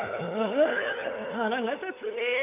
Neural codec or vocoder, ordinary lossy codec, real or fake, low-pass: codec, 16 kHz, 16 kbps, FunCodec, trained on LibriTTS, 50 frames a second; AAC, 24 kbps; fake; 3.6 kHz